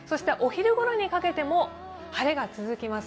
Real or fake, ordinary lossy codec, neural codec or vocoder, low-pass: real; none; none; none